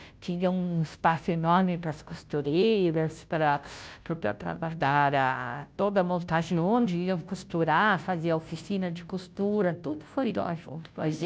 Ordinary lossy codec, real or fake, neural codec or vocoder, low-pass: none; fake; codec, 16 kHz, 0.5 kbps, FunCodec, trained on Chinese and English, 25 frames a second; none